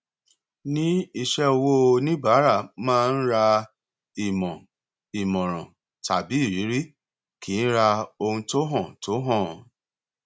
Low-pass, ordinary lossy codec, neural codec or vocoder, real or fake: none; none; none; real